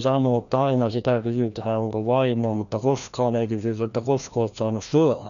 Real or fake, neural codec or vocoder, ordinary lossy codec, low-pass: fake; codec, 16 kHz, 1 kbps, FreqCodec, larger model; none; 7.2 kHz